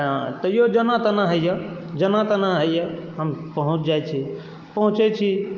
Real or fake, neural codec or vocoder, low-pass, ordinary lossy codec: real; none; 7.2 kHz; Opus, 32 kbps